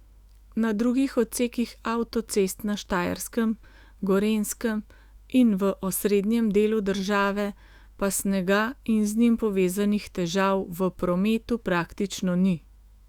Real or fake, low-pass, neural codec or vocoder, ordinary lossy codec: fake; 19.8 kHz; autoencoder, 48 kHz, 128 numbers a frame, DAC-VAE, trained on Japanese speech; Opus, 64 kbps